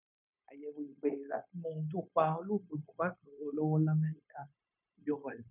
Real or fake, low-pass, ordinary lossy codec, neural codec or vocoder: fake; 3.6 kHz; none; codec, 16 kHz, 0.9 kbps, LongCat-Audio-Codec